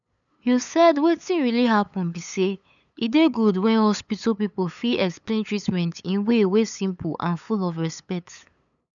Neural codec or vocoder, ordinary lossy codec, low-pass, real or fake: codec, 16 kHz, 8 kbps, FunCodec, trained on LibriTTS, 25 frames a second; none; 7.2 kHz; fake